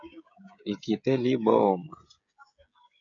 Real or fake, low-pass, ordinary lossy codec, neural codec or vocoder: fake; 7.2 kHz; AAC, 64 kbps; codec, 16 kHz, 16 kbps, FreqCodec, smaller model